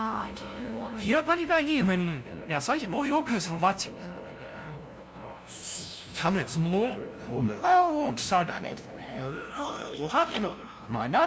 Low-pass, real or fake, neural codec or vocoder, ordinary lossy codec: none; fake; codec, 16 kHz, 0.5 kbps, FunCodec, trained on LibriTTS, 25 frames a second; none